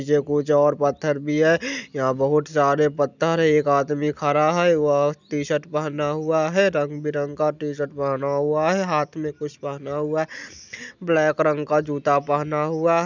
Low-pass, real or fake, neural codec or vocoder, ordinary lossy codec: 7.2 kHz; real; none; none